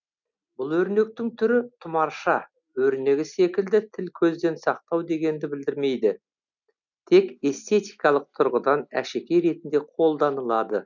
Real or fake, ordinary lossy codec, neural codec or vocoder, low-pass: real; none; none; 7.2 kHz